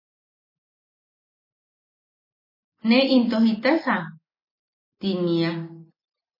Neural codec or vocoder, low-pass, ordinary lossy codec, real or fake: none; 5.4 kHz; MP3, 24 kbps; real